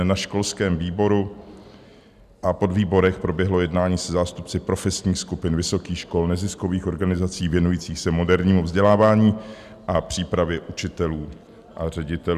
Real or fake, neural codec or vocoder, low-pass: real; none; 14.4 kHz